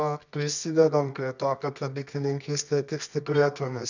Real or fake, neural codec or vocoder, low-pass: fake; codec, 24 kHz, 0.9 kbps, WavTokenizer, medium music audio release; 7.2 kHz